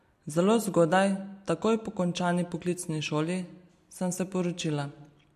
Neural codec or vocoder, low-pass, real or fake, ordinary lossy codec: none; 14.4 kHz; real; MP3, 64 kbps